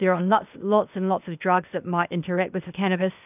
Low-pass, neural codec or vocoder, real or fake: 3.6 kHz; codec, 16 kHz, 0.8 kbps, ZipCodec; fake